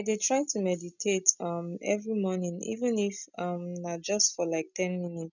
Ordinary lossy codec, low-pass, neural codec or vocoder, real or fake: none; 7.2 kHz; none; real